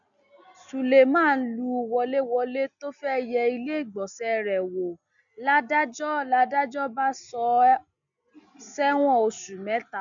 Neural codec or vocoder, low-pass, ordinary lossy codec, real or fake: none; 7.2 kHz; none; real